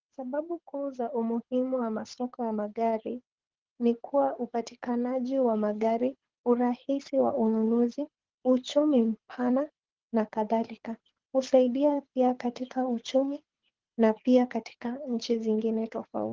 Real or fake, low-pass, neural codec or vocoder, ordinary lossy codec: fake; 7.2 kHz; codec, 24 kHz, 6 kbps, HILCodec; Opus, 16 kbps